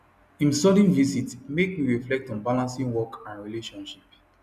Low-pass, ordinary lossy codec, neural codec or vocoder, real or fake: 14.4 kHz; none; none; real